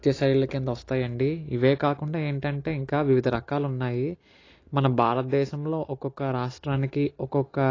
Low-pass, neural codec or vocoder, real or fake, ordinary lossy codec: 7.2 kHz; none; real; AAC, 32 kbps